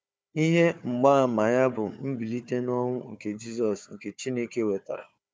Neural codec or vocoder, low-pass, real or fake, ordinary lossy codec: codec, 16 kHz, 4 kbps, FunCodec, trained on Chinese and English, 50 frames a second; none; fake; none